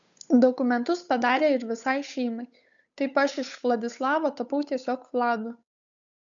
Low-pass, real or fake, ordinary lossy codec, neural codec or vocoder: 7.2 kHz; fake; MP3, 96 kbps; codec, 16 kHz, 8 kbps, FunCodec, trained on Chinese and English, 25 frames a second